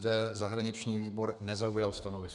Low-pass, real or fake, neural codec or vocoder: 10.8 kHz; fake; codec, 32 kHz, 1.9 kbps, SNAC